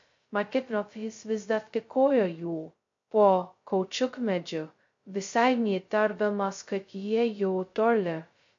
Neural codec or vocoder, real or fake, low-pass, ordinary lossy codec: codec, 16 kHz, 0.2 kbps, FocalCodec; fake; 7.2 kHz; MP3, 48 kbps